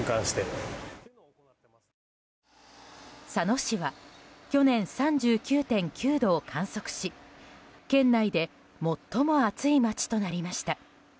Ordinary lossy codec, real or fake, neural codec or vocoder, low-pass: none; real; none; none